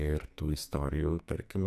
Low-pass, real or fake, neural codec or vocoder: 14.4 kHz; fake; codec, 44.1 kHz, 2.6 kbps, SNAC